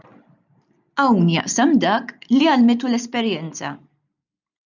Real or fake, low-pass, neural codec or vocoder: real; 7.2 kHz; none